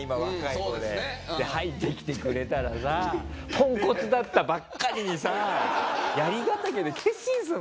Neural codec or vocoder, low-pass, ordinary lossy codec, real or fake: none; none; none; real